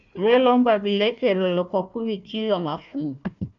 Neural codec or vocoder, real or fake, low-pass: codec, 16 kHz, 1 kbps, FunCodec, trained on Chinese and English, 50 frames a second; fake; 7.2 kHz